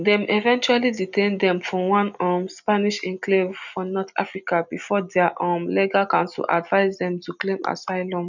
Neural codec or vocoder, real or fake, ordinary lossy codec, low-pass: none; real; none; 7.2 kHz